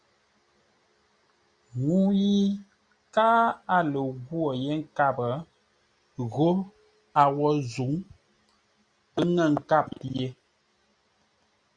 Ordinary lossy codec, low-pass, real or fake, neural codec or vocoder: Opus, 64 kbps; 9.9 kHz; real; none